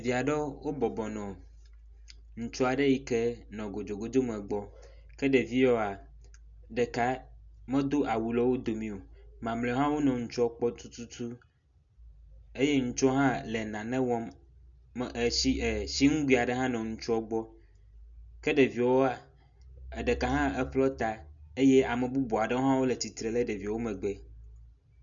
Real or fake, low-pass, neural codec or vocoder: real; 7.2 kHz; none